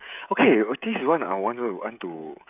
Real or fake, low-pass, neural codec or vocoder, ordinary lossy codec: fake; 3.6 kHz; codec, 16 kHz, 16 kbps, FreqCodec, smaller model; none